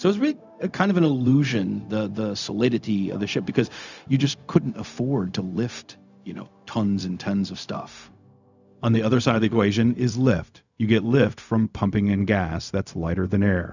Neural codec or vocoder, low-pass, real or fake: codec, 16 kHz, 0.4 kbps, LongCat-Audio-Codec; 7.2 kHz; fake